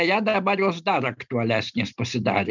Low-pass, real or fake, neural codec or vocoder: 7.2 kHz; real; none